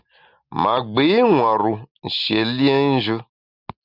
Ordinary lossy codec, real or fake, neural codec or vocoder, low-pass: Opus, 64 kbps; real; none; 5.4 kHz